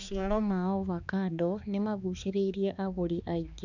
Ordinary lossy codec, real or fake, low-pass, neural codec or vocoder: none; fake; 7.2 kHz; codec, 16 kHz, 2 kbps, X-Codec, HuBERT features, trained on balanced general audio